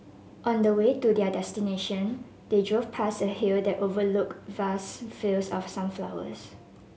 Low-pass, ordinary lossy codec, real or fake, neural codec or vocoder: none; none; real; none